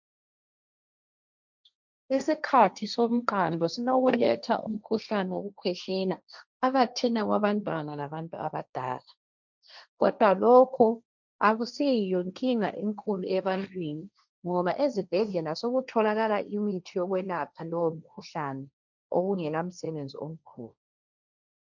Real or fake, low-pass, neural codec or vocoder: fake; 7.2 kHz; codec, 16 kHz, 1.1 kbps, Voila-Tokenizer